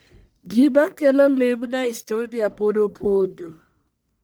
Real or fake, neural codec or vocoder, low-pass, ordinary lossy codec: fake; codec, 44.1 kHz, 1.7 kbps, Pupu-Codec; none; none